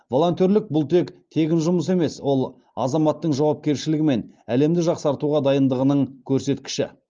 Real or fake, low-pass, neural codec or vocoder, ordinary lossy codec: real; 7.2 kHz; none; Opus, 24 kbps